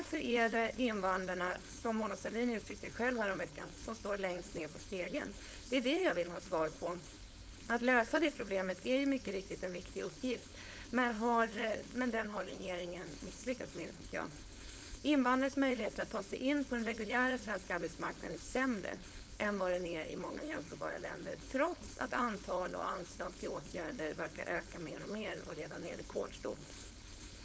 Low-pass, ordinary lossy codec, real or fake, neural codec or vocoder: none; none; fake; codec, 16 kHz, 4.8 kbps, FACodec